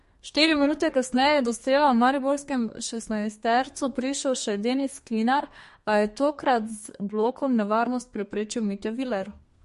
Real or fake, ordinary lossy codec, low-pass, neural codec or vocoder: fake; MP3, 48 kbps; 14.4 kHz; codec, 32 kHz, 1.9 kbps, SNAC